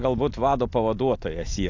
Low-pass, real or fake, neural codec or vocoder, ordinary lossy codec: 7.2 kHz; real; none; AAC, 48 kbps